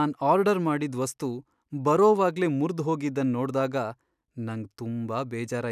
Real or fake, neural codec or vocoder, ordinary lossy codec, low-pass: real; none; none; 14.4 kHz